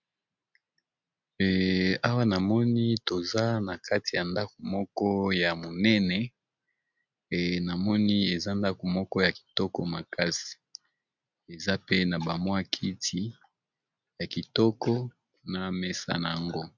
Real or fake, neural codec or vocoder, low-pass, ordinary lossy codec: real; none; 7.2 kHz; MP3, 64 kbps